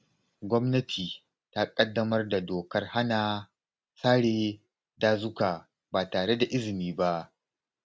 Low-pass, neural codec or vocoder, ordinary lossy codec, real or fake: none; none; none; real